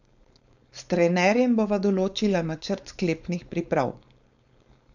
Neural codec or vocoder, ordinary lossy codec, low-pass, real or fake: codec, 16 kHz, 4.8 kbps, FACodec; none; 7.2 kHz; fake